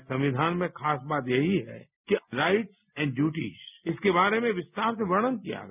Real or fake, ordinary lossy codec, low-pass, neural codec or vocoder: real; none; 3.6 kHz; none